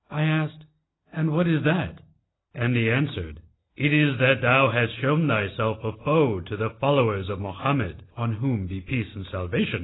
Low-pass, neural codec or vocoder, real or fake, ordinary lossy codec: 7.2 kHz; none; real; AAC, 16 kbps